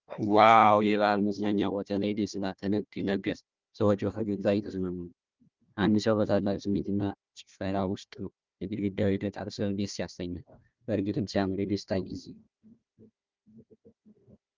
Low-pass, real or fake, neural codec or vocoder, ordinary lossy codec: 7.2 kHz; fake; codec, 16 kHz, 1 kbps, FunCodec, trained on Chinese and English, 50 frames a second; Opus, 24 kbps